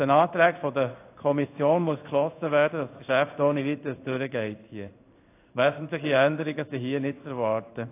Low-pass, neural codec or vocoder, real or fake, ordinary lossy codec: 3.6 kHz; codec, 16 kHz in and 24 kHz out, 1 kbps, XY-Tokenizer; fake; AAC, 24 kbps